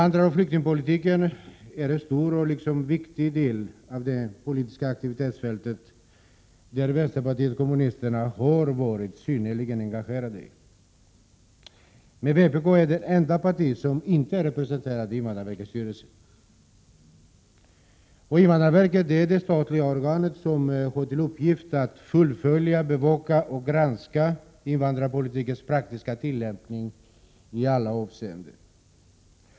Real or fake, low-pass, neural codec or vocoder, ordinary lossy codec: real; none; none; none